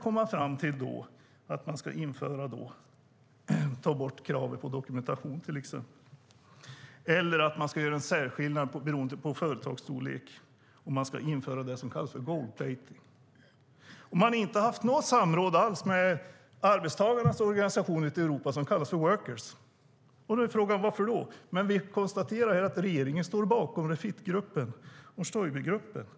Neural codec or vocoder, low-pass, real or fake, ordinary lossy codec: none; none; real; none